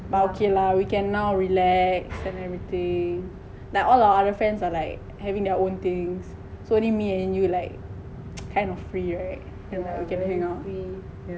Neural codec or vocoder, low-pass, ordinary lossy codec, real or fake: none; none; none; real